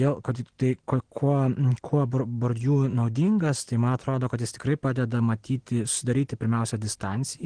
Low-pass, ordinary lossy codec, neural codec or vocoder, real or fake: 9.9 kHz; Opus, 16 kbps; none; real